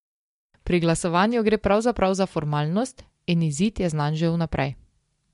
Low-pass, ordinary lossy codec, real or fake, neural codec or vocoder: 10.8 kHz; MP3, 64 kbps; real; none